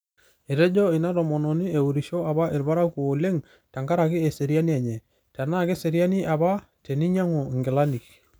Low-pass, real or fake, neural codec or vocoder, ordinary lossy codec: none; real; none; none